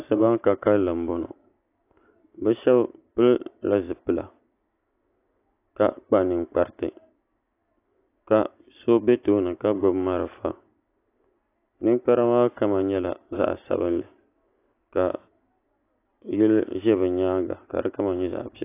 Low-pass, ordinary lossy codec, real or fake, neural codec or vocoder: 3.6 kHz; AAC, 24 kbps; real; none